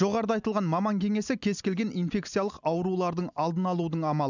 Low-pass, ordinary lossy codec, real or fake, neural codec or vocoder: 7.2 kHz; none; real; none